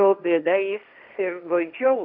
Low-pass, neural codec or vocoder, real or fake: 5.4 kHz; codec, 16 kHz, 1.1 kbps, Voila-Tokenizer; fake